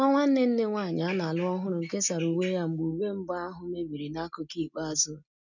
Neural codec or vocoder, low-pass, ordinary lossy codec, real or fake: none; 7.2 kHz; none; real